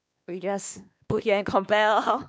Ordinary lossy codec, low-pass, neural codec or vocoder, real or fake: none; none; codec, 16 kHz, 2 kbps, X-Codec, WavLM features, trained on Multilingual LibriSpeech; fake